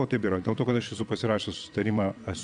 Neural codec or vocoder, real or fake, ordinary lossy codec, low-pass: vocoder, 22.05 kHz, 80 mel bands, WaveNeXt; fake; MP3, 96 kbps; 9.9 kHz